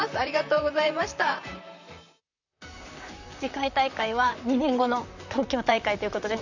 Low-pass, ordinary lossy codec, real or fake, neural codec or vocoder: 7.2 kHz; none; fake; vocoder, 44.1 kHz, 128 mel bands, Pupu-Vocoder